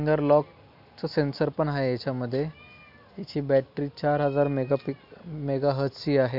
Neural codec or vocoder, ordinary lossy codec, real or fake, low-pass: none; AAC, 48 kbps; real; 5.4 kHz